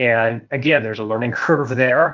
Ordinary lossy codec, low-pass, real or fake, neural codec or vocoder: Opus, 24 kbps; 7.2 kHz; fake; codec, 16 kHz, 0.8 kbps, ZipCodec